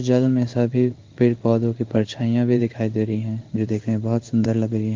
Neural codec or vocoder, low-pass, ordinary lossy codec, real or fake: codec, 16 kHz in and 24 kHz out, 1 kbps, XY-Tokenizer; 7.2 kHz; Opus, 24 kbps; fake